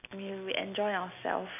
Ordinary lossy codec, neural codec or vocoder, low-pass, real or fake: none; none; 3.6 kHz; real